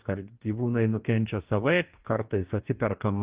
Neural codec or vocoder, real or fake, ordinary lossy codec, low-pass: codec, 44.1 kHz, 2.6 kbps, DAC; fake; Opus, 32 kbps; 3.6 kHz